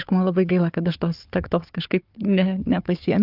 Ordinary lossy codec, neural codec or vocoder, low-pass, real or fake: Opus, 24 kbps; codec, 16 kHz, 4 kbps, FunCodec, trained on Chinese and English, 50 frames a second; 5.4 kHz; fake